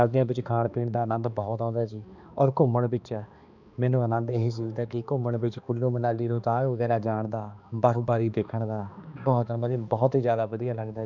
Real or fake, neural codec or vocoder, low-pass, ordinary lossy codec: fake; codec, 16 kHz, 2 kbps, X-Codec, HuBERT features, trained on balanced general audio; 7.2 kHz; none